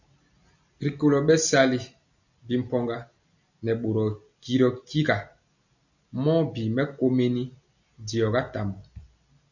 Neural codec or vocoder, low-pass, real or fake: none; 7.2 kHz; real